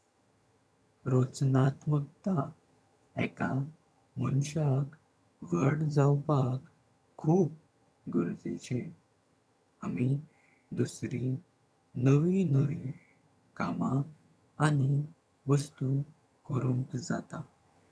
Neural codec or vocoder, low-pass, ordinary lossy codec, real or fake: vocoder, 22.05 kHz, 80 mel bands, HiFi-GAN; none; none; fake